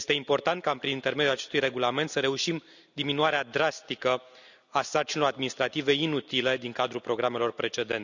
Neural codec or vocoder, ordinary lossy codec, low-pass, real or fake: none; none; 7.2 kHz; real